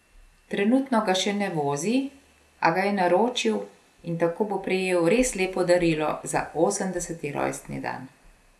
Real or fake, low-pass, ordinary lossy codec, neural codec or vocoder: real; none; none; none